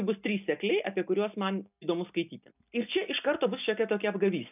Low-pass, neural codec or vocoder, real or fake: 3.6 kHz; none; real